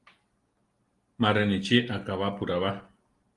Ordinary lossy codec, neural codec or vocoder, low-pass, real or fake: Opus, 24 kbps; none; 10.8 kHz; real